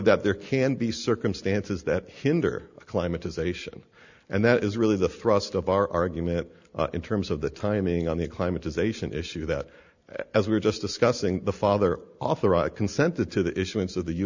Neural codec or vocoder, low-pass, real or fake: none; 7.2 kHz; real